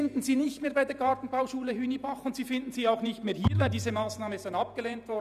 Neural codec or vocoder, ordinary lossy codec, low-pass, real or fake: vocoder, 48 kHz, 128 mel bands, Vocos; none; 14.4 kHz; fake